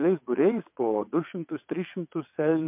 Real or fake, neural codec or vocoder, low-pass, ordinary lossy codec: fake; vocoder, 22.05 kHz, 80 mel bands, WaveNeXt; 3.6 kHz; MP3, 32 kbps